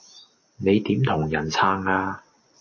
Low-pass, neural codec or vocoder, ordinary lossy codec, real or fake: 7.2 kHz; none; MP3, 32 kbps; real